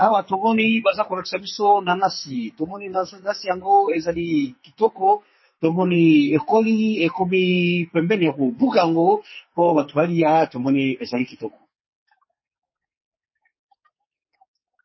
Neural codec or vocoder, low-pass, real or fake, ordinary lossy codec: codec, 44.1 kHz, 2.6 kbps, SNAC; 7.2 kHz; fake; MP3, 24 kbps